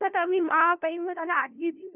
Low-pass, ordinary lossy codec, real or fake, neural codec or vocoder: 3.6 kHz; none; fake; codec, 16 kHz, 0.5 kbps, FunCodec, trained on LibriTTS, 25 frames a second